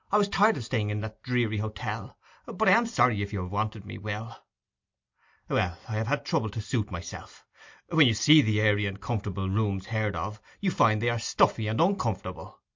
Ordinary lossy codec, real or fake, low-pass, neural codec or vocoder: MP3, 48 kbps; real; 7.2 kHz; none